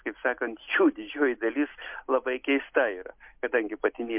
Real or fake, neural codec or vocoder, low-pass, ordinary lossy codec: real; none; 3.6 kHz; MP3, 32 kbps